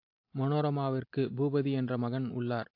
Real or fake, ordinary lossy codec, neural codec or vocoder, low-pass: real; AAC, 32 kbps; none; 5.4 kHz